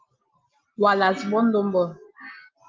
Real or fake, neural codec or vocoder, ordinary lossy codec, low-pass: real; none; Opus, 32 kbps; 7.2 kHz